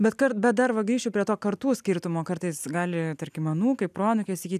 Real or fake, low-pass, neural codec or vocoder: real; 14.4 kHz; none